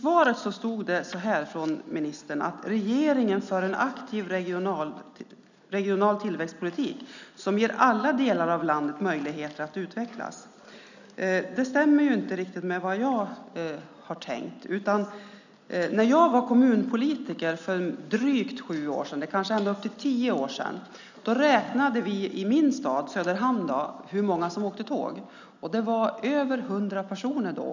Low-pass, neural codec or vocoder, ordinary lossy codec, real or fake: 7.2 kHz; none; none; real